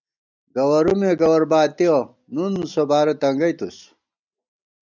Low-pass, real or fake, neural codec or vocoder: 7.2 kHz; real; none